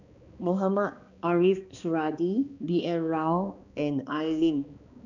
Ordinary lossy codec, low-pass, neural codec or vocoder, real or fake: none; 7.2 kHz; codec, 16 kHz, 2 kbps, X-Codec, HuBERT features, trained on balanced general audio; fake